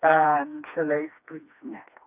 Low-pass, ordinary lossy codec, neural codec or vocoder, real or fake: 3.6 kHz; none; codec, 16 kHz, 2 kbps, FreqCodec, smaller model; fake